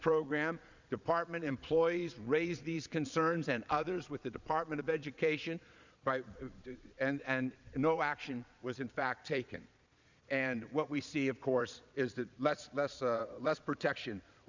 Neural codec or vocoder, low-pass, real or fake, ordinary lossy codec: vocoder, 22.05 kHz, 80 mel bands, Vocos; 7.2 kHz; fake; Opus, 64 kbps